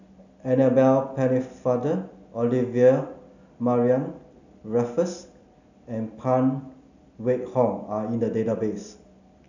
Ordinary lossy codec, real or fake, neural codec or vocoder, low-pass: none; real; none; 7.2 kHz